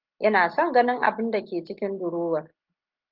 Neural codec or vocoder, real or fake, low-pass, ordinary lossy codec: none; real; 5.4 kHz; Opus, 32 kbps